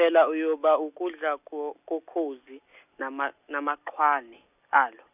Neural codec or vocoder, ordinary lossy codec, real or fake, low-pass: none; none; real; 3.6 kHz